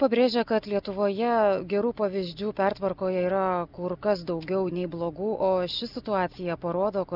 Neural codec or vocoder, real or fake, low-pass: none; real; 5.4 kHz